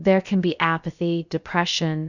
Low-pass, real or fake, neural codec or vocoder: 7.2 kHz; fake; codec, 16 kHz, about 1 kbps, DyCAST, with the encoder's durations